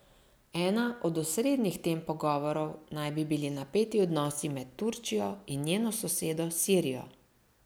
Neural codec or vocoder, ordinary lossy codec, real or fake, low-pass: none; none; real; none